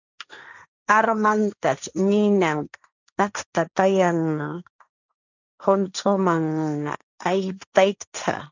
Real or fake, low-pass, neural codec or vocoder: fake; 7.2 kHz; codec, 16 kHz, 1.1 kbps, Voila-Tokenizer